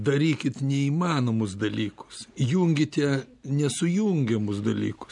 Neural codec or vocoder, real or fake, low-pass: none; real; 10.8 kHz